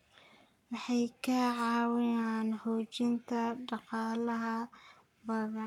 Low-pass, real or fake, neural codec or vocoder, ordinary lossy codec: 19.8 kHz; fake; codec, 44.1 kHz, 7.8 kbps, Pupu-Codec; none